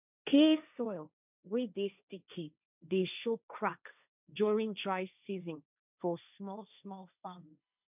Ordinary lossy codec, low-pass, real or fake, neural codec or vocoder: none; 3.6 kHz; fake; codec, 16 kHz, 1.1 kbps, Voila-Tokenizer